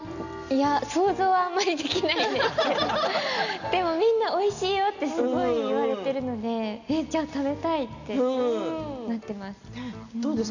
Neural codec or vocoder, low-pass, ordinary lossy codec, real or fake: none; 7.2 kHz; none; real